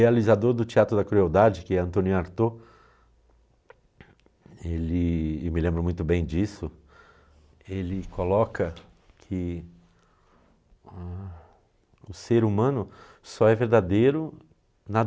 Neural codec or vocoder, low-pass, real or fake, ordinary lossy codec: none; none; real; none